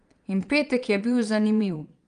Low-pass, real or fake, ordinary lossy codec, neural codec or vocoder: 9.9 kHz; fake; Opus, 32 kbps; vocoder, 22.05 kHz, 80 mel bands, Vocos